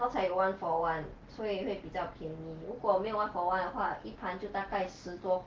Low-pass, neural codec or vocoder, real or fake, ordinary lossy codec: 7.2 kHz; none; real; Opus, 16 kbps